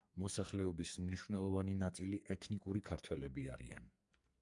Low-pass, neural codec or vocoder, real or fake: 10.8 kHz; codec, 44.1 kHz, 2.6 kbps, SNAC; fake